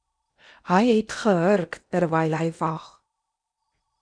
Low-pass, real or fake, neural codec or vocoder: 9.9 kHz; fake; codec, 16 kHz in and 24 kHz out, 0.8 kbps, FocalCodec, streaming, 65536 codes